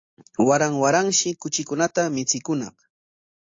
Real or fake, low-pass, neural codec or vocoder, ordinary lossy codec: real; 7.2 kHz; none; AAC, 48 kbps